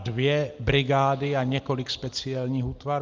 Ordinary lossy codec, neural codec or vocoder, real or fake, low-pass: Opus, 24 kbps; none; real; 7.2 kHz